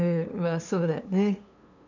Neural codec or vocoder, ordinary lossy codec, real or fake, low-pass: codec, 16 kHz, 2 kbps, FunCodec, trained on LibriTTS, 25 frames a second; none; fake; 7.2 kHz